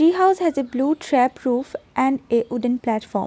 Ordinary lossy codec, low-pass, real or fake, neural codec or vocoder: none; none; real; none